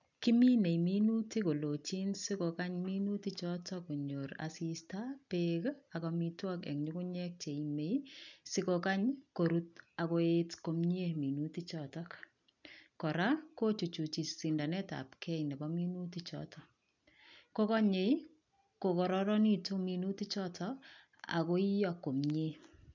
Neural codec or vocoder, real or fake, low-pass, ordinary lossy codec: none; real; 7.2 kHz; none